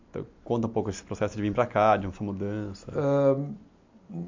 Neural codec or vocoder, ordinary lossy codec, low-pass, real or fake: none; MP3, 64 kbps; 7.2 kHz; real